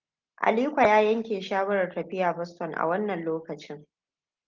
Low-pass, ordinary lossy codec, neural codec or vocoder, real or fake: 7.2 kHz; Opus, 32 kbps; none; real